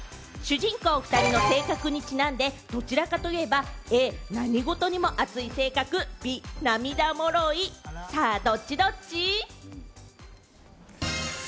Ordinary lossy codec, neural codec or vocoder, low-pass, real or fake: none; none; none; real